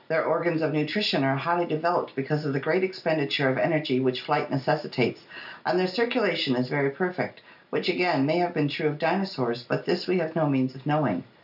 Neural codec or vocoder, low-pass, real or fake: none; 5.4 kHz; real